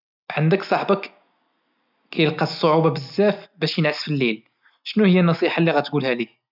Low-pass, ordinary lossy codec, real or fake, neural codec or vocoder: 5.4 kHz; none; real; none